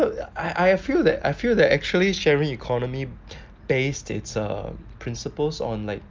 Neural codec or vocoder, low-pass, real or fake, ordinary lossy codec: none; 7.2 kHz; real; Opus, 24 kbps